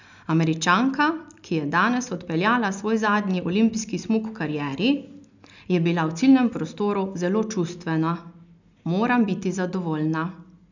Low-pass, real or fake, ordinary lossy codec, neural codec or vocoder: 7.2 kHz; real; none; none